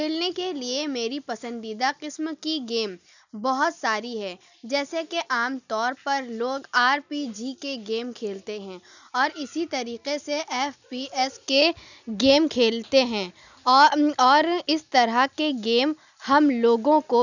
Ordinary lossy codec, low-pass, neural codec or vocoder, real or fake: none; 7.2 kHz; none; real